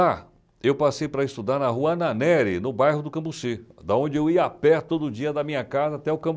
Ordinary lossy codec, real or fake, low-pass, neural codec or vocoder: none; real; none; none